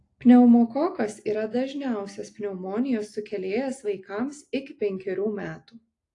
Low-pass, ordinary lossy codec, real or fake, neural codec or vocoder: 10.8 kHz; AAC, 48 kbps; real; none